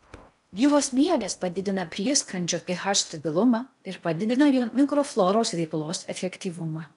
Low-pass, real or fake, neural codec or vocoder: 10.8 kHz; fake; codec, 16 kHz in and 24 kHz out, 0.6 kbps, FocalCodec, streaming, 4096 codes